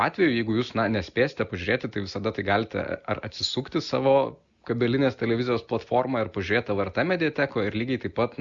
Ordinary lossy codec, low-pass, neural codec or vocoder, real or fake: Opus, 64 kbps; 7.2 kHz; none; real